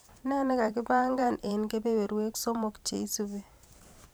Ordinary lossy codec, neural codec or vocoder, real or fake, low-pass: none; vocoder, 44.1 kHz, 128 mel bands every 512 samples, BigVGAN v2; fake; none